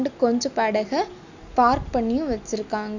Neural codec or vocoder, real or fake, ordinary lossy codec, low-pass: none; real; none; 7.2 kHz